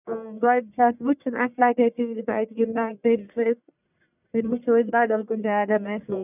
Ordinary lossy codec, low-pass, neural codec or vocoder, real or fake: none; 3.6 kHz; codec, 44.1 kHz, 1.7 kbps, Pupu-Codec; fake